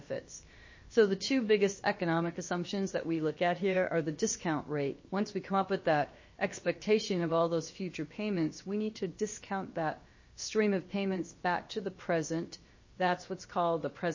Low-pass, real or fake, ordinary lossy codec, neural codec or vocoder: 7.2 kHz; fake; MP3, 32 kbps; codec, 16 kHz, about 1 kbps, DyCAST, with the encoder's durations